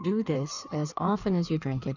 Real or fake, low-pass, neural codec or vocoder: fake; 7.2 kHz; codec, 16 kHz in and 24 kHz out, 1.1 kbps, FireRedTTS-2 codec